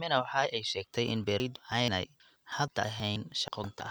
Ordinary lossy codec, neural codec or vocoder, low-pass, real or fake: none; none; none; real